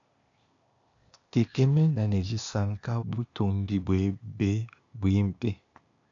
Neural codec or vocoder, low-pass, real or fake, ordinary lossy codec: codec, 16 kHz, 0.8 kbps, ZipCodec; 7.2 kHz; fake; MP3, 96 kbps